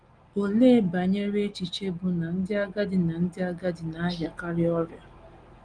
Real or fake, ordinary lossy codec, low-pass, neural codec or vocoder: real; Opus, 24 kbps; 9.9 kHz; none